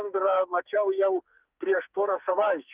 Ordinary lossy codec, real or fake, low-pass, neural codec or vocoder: Opus, 24 kbps; fake; 3.6 kHz; codec, 44.1 kHz, 2.6 kbps, SNAC